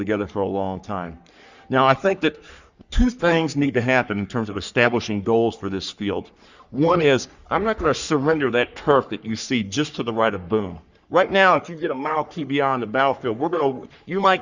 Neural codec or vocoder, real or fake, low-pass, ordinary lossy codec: codec, 44.1 kHz, 3.4 kbps, Pupu-Codec; fake; 7.2 kHz; Opus, 64 kbps